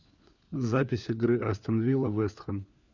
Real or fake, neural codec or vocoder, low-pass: fake; codec, 16 kHz, 4 kbps, FunCodec, trained on LibriTTS, 50 frames a second; 7.2 kHz